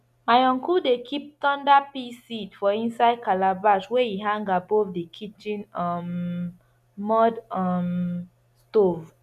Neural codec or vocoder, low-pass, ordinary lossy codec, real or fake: none; 14.4 kHz; none; real